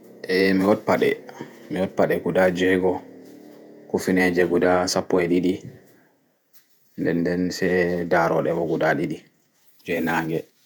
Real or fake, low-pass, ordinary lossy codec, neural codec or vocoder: fake; none; none; vocoder, 48 kHz, 128 mel bands, Vocos